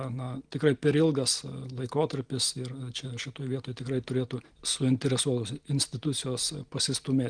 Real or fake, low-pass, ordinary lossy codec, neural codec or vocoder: real; 9.9 kHz; Opus, 24 kbps; none